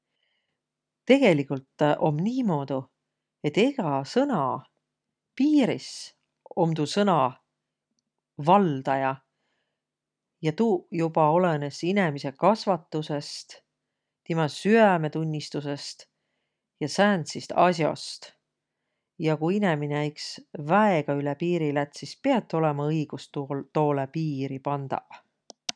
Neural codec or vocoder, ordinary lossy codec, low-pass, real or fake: none; none; 9.9 kHz; real